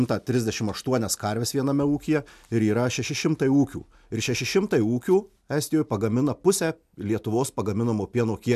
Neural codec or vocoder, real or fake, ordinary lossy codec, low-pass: none; real; MP3, 96 kbps; 14.4 kHz